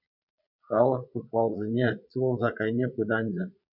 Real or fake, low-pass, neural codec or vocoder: fake; 5.4 kHz; vocoder, 22.05 kHz, 80 mel bands, Vocos